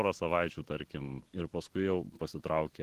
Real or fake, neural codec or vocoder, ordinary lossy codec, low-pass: fake; autoencoder, 48 kHz, 128 numbers a frame, DAC-VAE, trained on Japanese speech; Opus, 16 kbps; 14.4 kHz